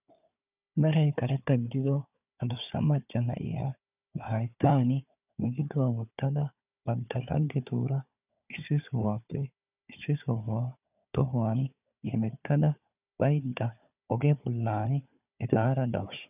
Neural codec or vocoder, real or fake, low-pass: codec, 16 kHz, 4 kbps, FunCodec, trained on Chinese and English, 50 frames a second; fake; 3.6 kHz